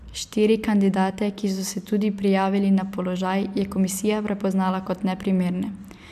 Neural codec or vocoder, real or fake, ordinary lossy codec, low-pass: none; real; AAC, 96 kbps; 14.4 kHz